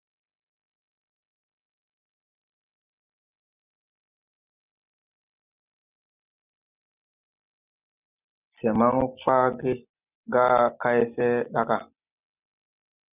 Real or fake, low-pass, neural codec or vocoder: real; 3.6 kHz; none